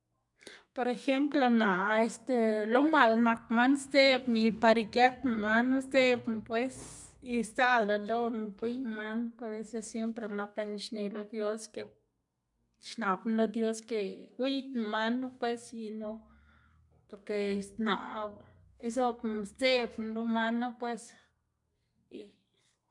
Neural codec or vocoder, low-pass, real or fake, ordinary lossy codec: codec, 32 kHz, 1.9 kbps, SNAC; 10.8 kHz; fake; none